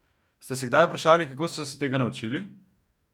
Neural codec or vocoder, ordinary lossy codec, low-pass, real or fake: codec, 44.1 kHz, 2.6 kbps, DAC; none; 19.8 kHz; fake